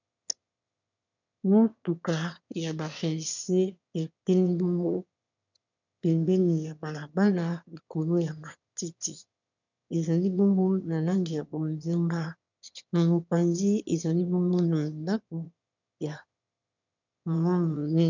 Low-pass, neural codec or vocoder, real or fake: 7.2 kHz; autoencoder, 22.05 kHz, a latent of 192 numbers a frame, VITS, trained on one speaker; fake